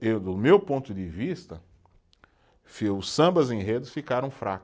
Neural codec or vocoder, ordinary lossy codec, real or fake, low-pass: none; none; real; none